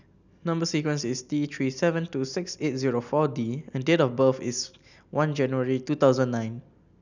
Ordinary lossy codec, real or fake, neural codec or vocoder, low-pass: none; real; none; 7.2 kHz